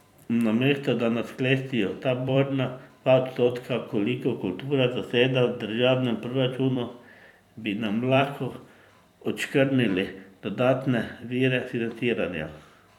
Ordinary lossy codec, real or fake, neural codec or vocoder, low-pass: none; fake; vocoder, 44.1 kHz, 128 mel bands every 256 samples, BigVGAN v2; 19.8 kHz